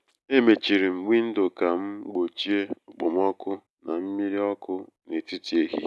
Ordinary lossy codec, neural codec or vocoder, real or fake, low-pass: none; none; real; none